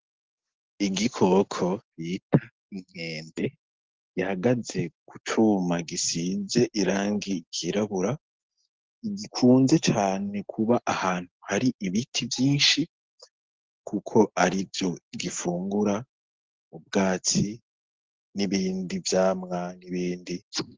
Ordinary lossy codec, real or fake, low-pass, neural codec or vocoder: Opus, 16 kbps; real; 7.2 kHz; none